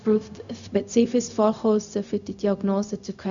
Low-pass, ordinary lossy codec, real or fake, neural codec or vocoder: 7.2 kHz; none; fake; codec, 16 kHz, 0.4 kbps, LongCat-Audio-Codec